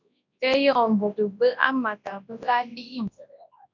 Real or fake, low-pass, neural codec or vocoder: fake; 7.2 kHz; codec, 24 kHz, 0.9 kbps, WavTokenizer, large speech release